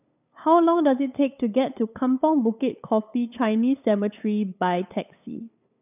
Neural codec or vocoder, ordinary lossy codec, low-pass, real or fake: codec, 16 kHz, 8 kbps, FunCodec, trained on LibriTTS, 25 frames a second; none; 3.6 kHz; fake